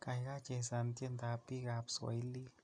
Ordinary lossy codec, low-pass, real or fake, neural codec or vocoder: MP3, 96 kbps; 9.9 kHz; real; none